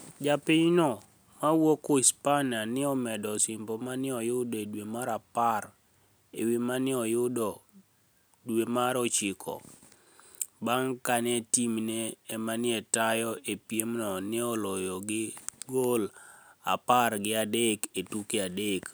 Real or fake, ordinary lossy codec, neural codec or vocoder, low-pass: real; none; none; none